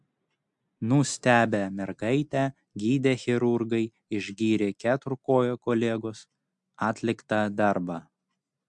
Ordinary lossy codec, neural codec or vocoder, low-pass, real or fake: MP3, 64 kbps; none; 10.8 kHz; real